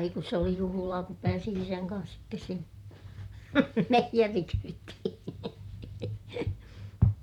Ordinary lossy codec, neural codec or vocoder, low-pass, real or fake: none; vocoder, 44.1 kHz, 128 mel bands, Pupu-Vocoder; 19.8 kHz; fake